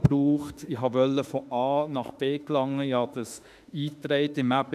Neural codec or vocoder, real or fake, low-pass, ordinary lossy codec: autoencoder, 48 kHz, 32 numbers a frame, DAC-VAE, trained on Japanese speech; fake; 14.4 kHz; none